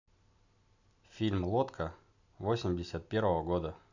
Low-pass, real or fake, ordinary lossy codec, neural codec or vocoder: 7.2 kHz; real; none; none